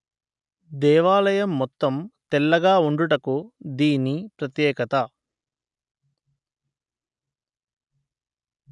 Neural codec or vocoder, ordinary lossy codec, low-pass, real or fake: none; none; 10.8 kHz; real